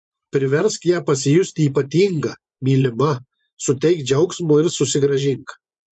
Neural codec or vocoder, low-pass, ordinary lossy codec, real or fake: vocoder, 24 kHz, 100 mel bands, Vocos; 10.8 kHz; MP3, 64 kbps; fake